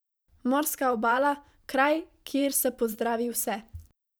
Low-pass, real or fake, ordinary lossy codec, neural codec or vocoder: none; fake; none; vocoder, 44.1 kHz, 128 mel bands every 512 samples, BigVGAN v2